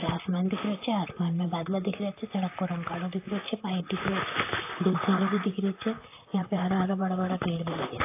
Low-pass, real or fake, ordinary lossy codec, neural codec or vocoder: 3.6 kHz; fake; AAC, 24 kbps; vocoder, 44.1 kHz, 128 mel bands, Pupu-Vocoder